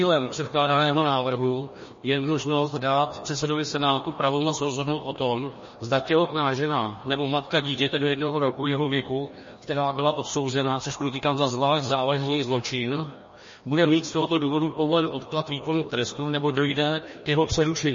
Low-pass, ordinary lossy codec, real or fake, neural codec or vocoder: 7.2 kHz; MP3, 32 kbps; fake; codec, 16 kHz, 1 kbps, FreqCodec, larger model